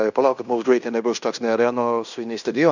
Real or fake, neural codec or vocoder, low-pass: fake; codec, 16 kHz in and 24 kHz out, 0.9 kbps, LongCat-Audio-Codec, fine tuned four codebook decoder; 7.2 kHz